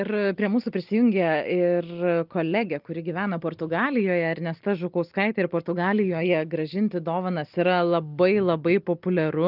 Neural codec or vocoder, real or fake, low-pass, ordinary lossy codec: none; real; 5.4 kHz; Opus, 24 kbps